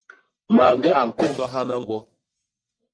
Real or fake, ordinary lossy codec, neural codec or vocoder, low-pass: fake; MP3, 64 kbps; codec, 44.1 kHz, 1.7 kbps, Pupu-Codec; 9.9 kHz